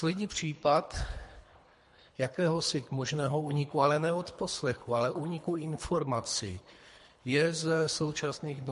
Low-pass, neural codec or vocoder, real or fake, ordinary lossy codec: 10.8 kHz; codec, 24 kHz, 3 kbps, HILCodec; fake; MP3, 48 kbps